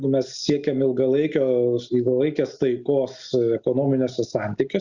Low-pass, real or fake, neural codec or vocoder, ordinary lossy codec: 7.2 kHz; real; none; Opus, 64 kbps